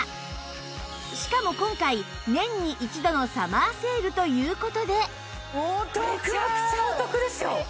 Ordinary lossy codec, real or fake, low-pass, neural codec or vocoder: none; real; none; none